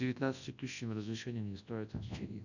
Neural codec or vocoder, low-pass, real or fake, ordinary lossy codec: codec, 24 kHz, 0.9 kbps, WavTokenizer, large speech release; 7.2 kHz; fake; none